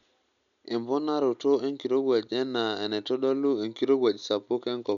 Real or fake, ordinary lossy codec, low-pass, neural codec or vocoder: real; none; 7.2 kHz; none